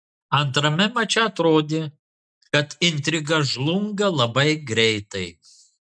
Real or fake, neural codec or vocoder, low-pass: real; none; 9.9 kHz